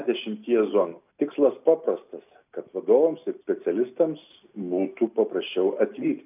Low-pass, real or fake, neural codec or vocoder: 3.6 kHz; real; none